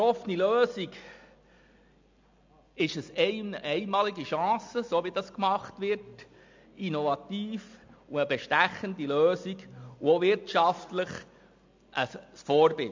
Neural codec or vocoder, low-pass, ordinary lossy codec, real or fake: none; 7.2 kHz; MP3, 64 kbps; real